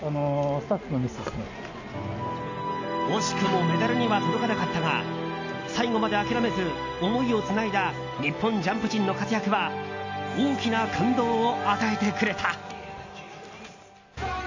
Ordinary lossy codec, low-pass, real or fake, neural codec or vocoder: AAC, 48 kbps; 7.2 kHz; real; none